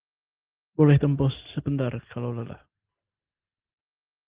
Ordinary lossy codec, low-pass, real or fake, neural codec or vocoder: Opus, 16 kbps; 3.6 kHz; real; none